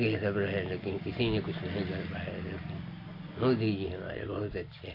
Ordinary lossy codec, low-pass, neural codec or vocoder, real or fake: AAC, 32 kbps; 5.4 kHz; none; real